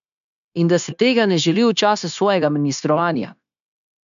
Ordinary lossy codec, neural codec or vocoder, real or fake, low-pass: none; codec, 16 kHz, 0.9 kbps, LongCat-Audio-Codec; fake; 7.2 kHz